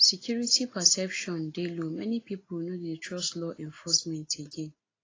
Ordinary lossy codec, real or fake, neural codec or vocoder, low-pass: AAC, 32 kbps; real; none; 7.2 kHz